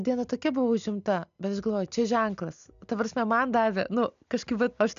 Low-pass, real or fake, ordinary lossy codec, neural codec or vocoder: 7.2 kHz; real; AAC, 96 kbps; none